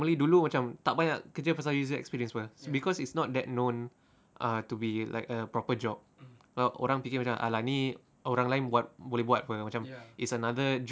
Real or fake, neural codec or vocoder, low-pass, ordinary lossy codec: real; none; none; none